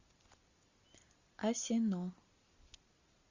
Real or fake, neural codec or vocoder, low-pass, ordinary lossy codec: real; none; 7.2 kHz; Opus, 64 kbps